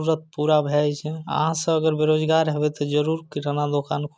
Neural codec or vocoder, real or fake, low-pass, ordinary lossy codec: none; real; none; none